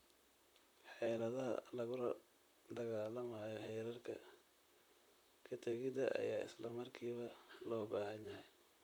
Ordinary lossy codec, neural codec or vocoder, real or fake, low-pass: none; vocoder, 44.1 kHz, 128 mel bands, Pupu-Vocoder; fake; none